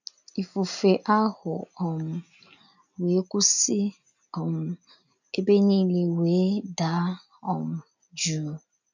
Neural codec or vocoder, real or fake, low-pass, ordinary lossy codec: none; real; 7.2 kHz; none